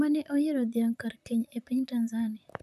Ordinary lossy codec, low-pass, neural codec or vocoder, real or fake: none; 14.4 kHz; vocoder, 44.1 kHz, 128 mel bands, Pupu-Vocoder; fake